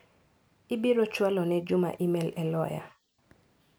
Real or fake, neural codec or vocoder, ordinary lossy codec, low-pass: real; none; none; none